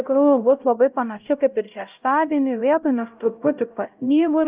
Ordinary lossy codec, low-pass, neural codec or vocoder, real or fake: Opus, 24 kbps; 3.6 kHz; codec, 16 kHz, 0.5 kbps, X-Codec, HuBERT features, trained on LibriSpeech; fake